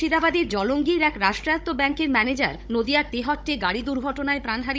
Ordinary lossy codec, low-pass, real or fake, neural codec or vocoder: none; none; fake; codec, 16 kHz, 16 kbps, FunCodec, trained on Chinese and English, 50 frames a second